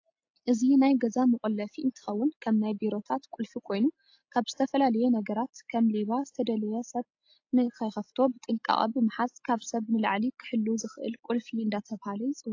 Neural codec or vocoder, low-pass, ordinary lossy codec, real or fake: none; 7.2 kHz; AAC, 48 kbps; real